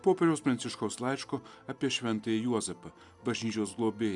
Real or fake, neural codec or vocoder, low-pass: real; none; 10.8 kHz